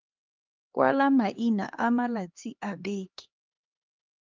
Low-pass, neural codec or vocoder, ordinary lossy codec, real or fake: 7.2 kHz; codec, 16 kHz, 2 kbps, X-Codec, HuBERT features, trained on LibriSpeech; Opus, 32 kbps; fake